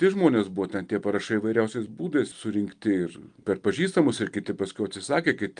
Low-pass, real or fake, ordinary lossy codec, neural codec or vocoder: 9.9 kHz; real; Opus, 32 kbps; none